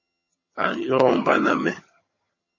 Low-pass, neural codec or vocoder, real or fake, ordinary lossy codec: 7.2 kHz; vocoder, 22.05 kHz, 80 mel bands, HiFi-GAN; fake; MP3, 32 kbps